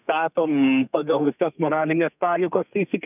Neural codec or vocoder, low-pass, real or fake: codec, 32 kHz, 1.9 kbps, SNAC; 3.6 kHz; fake